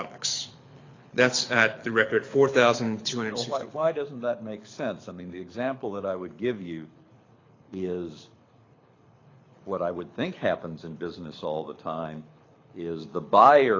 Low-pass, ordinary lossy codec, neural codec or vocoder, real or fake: 7.2 kHz; AAC, 32 kbps; codec, 24 kHz, 6 kbps, HILCodec; fake